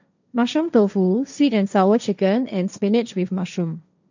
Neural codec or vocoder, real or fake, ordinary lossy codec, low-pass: codec, 16 kHz, 1.1 kbps, Voila-Tokenizer; fake; none; 7.2 kHz